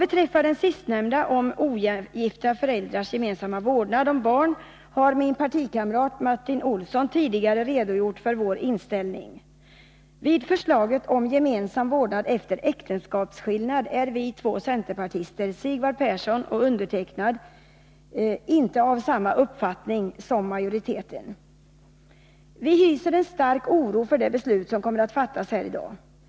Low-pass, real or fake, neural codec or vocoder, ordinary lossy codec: none; real; none; none